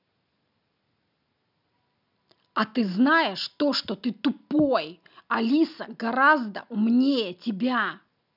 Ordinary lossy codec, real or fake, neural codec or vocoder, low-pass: none; real; none; 5.4 kHz